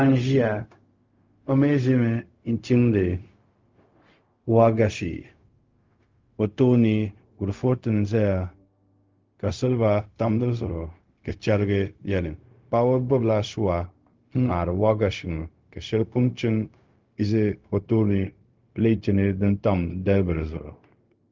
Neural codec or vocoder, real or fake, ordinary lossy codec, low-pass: codec, 16 kHz, 0.4 kbps, LongCat-Audio-Codec; fake; Opus, 32 kbps; 7.2 kHz